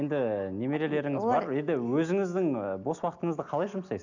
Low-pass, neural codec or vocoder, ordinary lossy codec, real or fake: 7.2 kHz; none; none; real